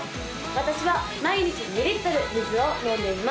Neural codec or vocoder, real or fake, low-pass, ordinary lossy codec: none; real; none; none